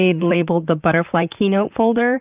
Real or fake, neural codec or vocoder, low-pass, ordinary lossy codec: fake; vocoder, 44.1 kHz, 128 mel bands, Pupu-Vocoder; 3.6 kHz; Opus, 24 kbps